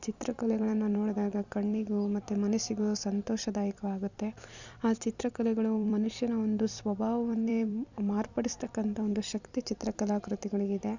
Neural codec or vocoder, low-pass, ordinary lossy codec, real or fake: vocoder, 44.1 kHz, 128 mel bands every 256 samples, BigVGAN v2; 7.2 kHz; none; fake